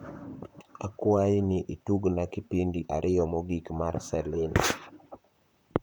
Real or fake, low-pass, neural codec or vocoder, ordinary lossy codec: fake; none; vocoder, 44.1 kHz, 128 mel bands, Pupu-Vocoder; none